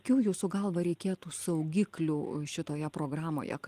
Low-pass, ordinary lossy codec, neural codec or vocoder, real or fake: 14.4 kHz; Opus, 24 kbps; none; real